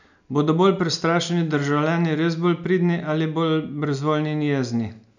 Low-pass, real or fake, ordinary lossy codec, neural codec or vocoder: 7.2 kHz; real; none; none